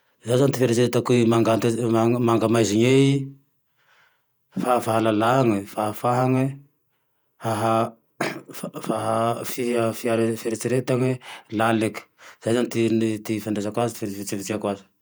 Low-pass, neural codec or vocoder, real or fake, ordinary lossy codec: none; vocoder, 48 kHz, 128 mel bands, Vocos; fake; none